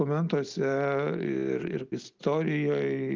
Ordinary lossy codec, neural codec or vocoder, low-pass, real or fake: Opus, 24 kbps; none; 7.2 kHz; real